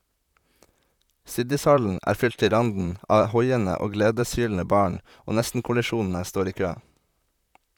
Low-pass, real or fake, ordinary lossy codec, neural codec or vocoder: 19.8 kHz; fake; none; vocoder, 44.1 kHz, 128 mel bands, Pupu-Vocoder